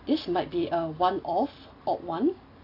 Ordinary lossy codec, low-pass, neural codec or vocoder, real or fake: AAC, 32 kbps; 5.4 kHz; none; real